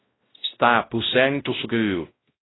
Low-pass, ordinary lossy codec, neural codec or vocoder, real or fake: 7.2 kHz; AAC, 16 kbps; codec, 16 kHz, 0.5 kbps, X-Codec, WavLM features, trained on Multilingual LibriSpeech; fake